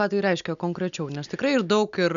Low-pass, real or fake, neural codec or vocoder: 7.2 kHz; real; none